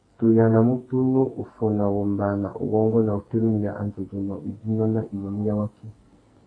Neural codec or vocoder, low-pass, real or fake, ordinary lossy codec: codec, 44.1 kHz, 2.6 kbps, SNAC; 9.9 kHz; fake; AAC, 32 kbps